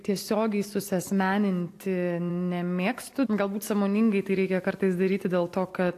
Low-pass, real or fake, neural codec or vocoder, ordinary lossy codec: 14.4 kHz; real; none; AAC, 64 kbps